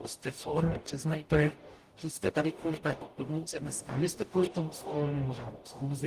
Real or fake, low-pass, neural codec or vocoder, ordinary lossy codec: fake; 14.4 kHz; codec, 44.1 kHz, 0.9 kbps, DAC; Opus, 24 kbps